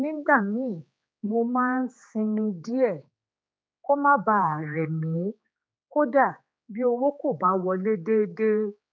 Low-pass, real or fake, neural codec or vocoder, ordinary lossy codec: none; fake; codec, 16 kHz, 4 kbps, X-Codec, HuBERT features, trained on general audio; none